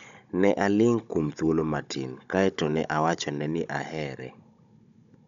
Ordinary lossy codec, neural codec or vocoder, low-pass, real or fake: MP3, 96 kbps; codec, 16 kHz, 16 kbps, FunCodec, trained on Chinese and English, 50 frames a second; 7.2 kHz; fake